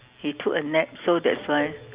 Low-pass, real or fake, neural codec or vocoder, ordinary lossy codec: 3.6 kHz; real; none; Opus, 64 kbps